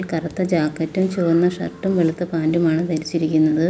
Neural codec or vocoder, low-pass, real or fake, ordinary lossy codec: none; none; real; none